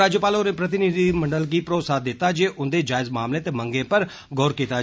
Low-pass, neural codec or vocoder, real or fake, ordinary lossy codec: none; none; real; none